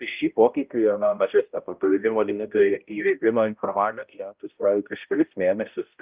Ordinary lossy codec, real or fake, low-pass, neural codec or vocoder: Opus, 24 kbps; fake; 3.6 kHz; codec, 16 kHz, 0.5 kbps, X-Codec, HuBERT features, trained on balanced general audio